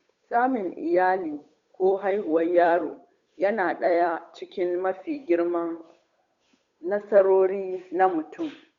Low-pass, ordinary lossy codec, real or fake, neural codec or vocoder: 7.2 kHz; Opus, 64 kbps; fake; codec, 16 kHz, 8 kbps, FunCodec, trained on Chinese and English, 25 frames a second